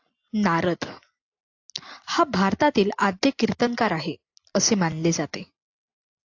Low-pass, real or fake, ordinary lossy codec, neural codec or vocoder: 7.2 kHz; real; AAC, 48 kbps; none